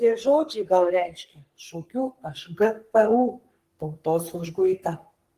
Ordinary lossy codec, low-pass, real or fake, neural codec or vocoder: Opus, 24 kbps; 14.4 kHz; fake; codec, 44.1 kHz, 3.4 kbps, Pupu-Codec